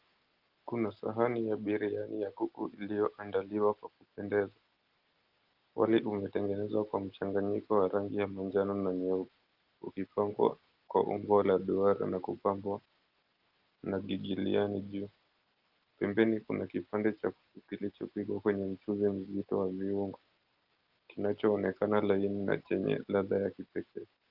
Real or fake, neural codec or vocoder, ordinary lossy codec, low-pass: real; none; Opus, 32 kbps; 5.4 kHz